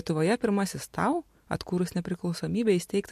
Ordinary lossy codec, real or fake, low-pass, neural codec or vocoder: MP3, 64 kbps; real; 14.4 kHz; none